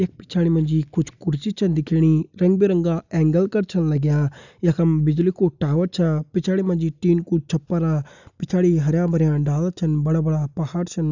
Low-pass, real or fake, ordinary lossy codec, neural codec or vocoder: 7.2 kHz; real; none; none